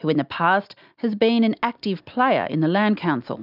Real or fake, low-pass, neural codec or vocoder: real; 5.4 kHz; none